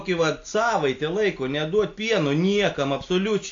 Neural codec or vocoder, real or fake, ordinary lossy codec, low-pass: none; real; AAC, 48 kbps; 7.2 kHz